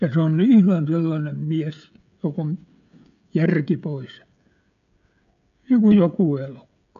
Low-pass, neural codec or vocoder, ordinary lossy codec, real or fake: 7.2 kHz; codec, 16 kHz, 16 kbps, FreqCodec, smaller model; none; fake